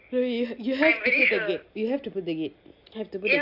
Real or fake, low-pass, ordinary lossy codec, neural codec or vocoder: real; 5.4 kHz; none; none